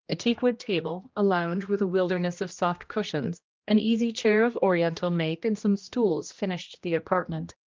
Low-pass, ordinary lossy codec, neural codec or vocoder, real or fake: 7.2 kHz; Opus, 24 kbps; codec, 16 kHz, 1 kbps, X-Codec, HuBERT features, trained on general audio; fake